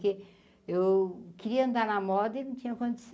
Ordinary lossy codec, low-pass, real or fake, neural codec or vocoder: none; none; real; none